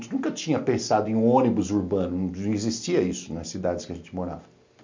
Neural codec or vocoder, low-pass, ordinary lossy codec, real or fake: none; 7.2 kHz; MP3, 64 kbps; real